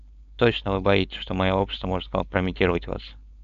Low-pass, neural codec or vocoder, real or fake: 7.2 kHz; codec, 16 kHz, 4.8 kbps, FACodec; fake